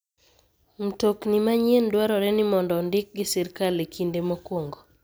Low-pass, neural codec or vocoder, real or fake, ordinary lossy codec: none; none; real; none